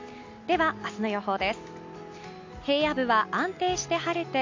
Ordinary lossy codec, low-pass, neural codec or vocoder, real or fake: MP3, 48 kbps; 7.2 kHz; none; real